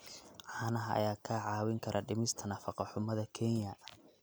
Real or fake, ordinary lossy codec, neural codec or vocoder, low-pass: real; none; none; none